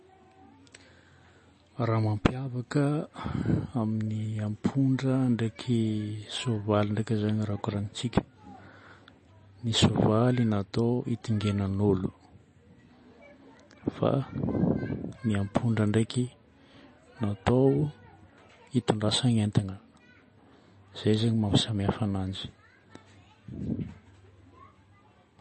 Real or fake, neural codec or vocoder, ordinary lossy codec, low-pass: real; none; MP3, 32 kbps; 10.8 kHz